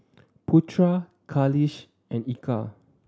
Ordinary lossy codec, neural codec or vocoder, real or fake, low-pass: none; none; real; none